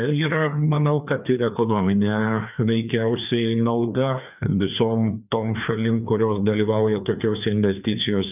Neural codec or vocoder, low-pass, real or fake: codec, 16 kHz, 2 kbps, FreqCodec, larger model; 3.6 kHz; fake